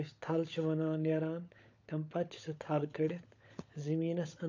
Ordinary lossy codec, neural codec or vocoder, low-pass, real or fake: AAC, 48 kbps; codec, 16 kHz, 16 kbps, FunCodec, trained on LibriTTS, 50 frames a second; 7.2 kHz; fake